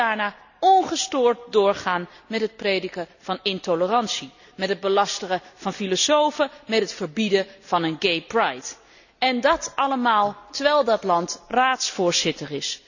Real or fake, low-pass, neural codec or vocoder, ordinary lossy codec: real; 7.2 kHz; none; none